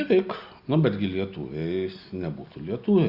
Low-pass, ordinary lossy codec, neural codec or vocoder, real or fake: 5.4 kHz; Opus, 64 kbps; none; real